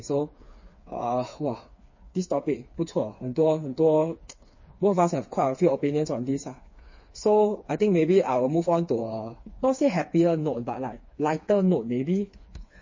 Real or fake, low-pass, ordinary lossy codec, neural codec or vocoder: fake; 7.2 kHz; MP3, 32 kbps; codec, 16 kHz, 4 kbps, FreqCodec, smaller model